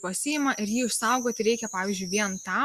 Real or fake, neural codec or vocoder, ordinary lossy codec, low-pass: real; none; Opus, 64 kbps; 14.4 kHz